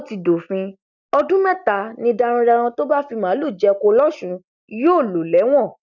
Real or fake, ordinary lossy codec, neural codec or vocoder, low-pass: real; none; none; 7.2 kHz